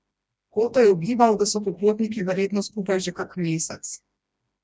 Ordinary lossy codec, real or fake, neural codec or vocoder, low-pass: none; fake; codec, 16 kHz, 1 kbps, FreqCodec, smaller model; none